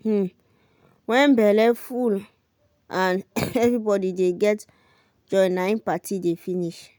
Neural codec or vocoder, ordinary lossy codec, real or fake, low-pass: none; none; real; none